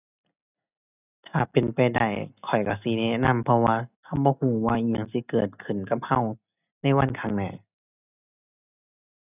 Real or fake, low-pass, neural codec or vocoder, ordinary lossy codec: real; 3.6 kHz; none; none